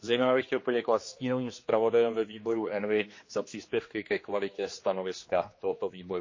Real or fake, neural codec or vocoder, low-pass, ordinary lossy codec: fake; codec, 16 kHz, 2 kbps, X-Codec, HuBERT features, trained on general audio; 7.2 kHz; MP3, 32 kbps